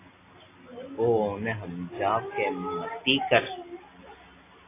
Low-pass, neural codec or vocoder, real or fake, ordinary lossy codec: 3.6 kHz; none; real; AAC, 24 kbps